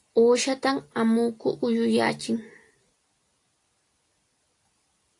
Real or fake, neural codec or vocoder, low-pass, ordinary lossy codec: real; none; 10.8 kHz; AAC, 48 kbps